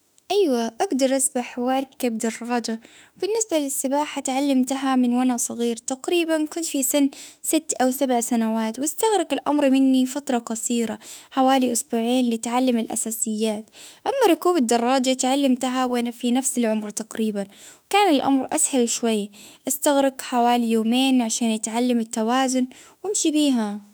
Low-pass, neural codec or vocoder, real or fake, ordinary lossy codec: none; autoencoder, 48 kHz, 32 numbers a frame, DAC-VAE, trained on Japanese speech; fake; none